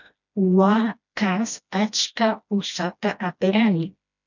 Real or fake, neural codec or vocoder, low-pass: fake; codec, 16 kHz, 1 kbps, FreqCodec, smaller model; 7.2 kHz